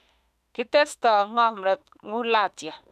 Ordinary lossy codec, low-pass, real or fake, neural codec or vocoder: none; 14.4 kHz; fake; autoencoder, 48 kHz, 32 numbers a frame, DAC-VAE, trained on Japanese speech